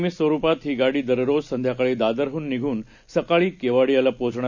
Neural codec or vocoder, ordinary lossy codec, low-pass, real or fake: none; none; 7.2 kHz; real